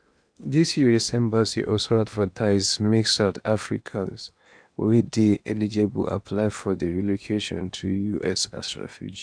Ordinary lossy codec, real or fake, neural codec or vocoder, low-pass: none; fake; codec, 16 kHz in and 24 kHz out, 0.8 kbps, FocalCodec, streaming, 65536 codes; 9.9 kHz